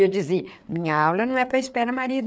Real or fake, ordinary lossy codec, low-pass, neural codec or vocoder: fake; none; none; codec, 16 kHz, 4 kbps, FreqCodec, larger model